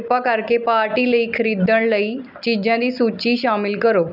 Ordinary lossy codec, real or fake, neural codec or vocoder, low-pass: none; real; none; 5.4 kHz